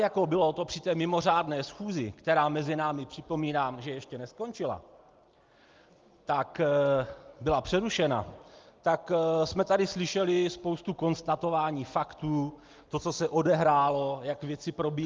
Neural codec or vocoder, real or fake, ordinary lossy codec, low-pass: none; real; Opus, 32 kbps; 7.2 kHz